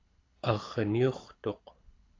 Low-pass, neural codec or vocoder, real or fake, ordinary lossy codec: 7.2 kHz; codec, 24 kHz, 6 kbps, HILCodec; fake; AAC, 32 kbps